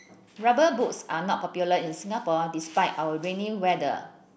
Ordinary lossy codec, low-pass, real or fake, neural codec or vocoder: none; none; real; none